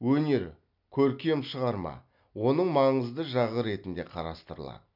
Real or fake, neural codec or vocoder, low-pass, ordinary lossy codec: real; none; 5.4 kHz; none